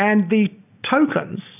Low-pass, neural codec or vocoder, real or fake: 3.6 kHz; none; real